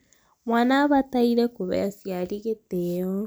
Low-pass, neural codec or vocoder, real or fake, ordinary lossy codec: none; none; real; none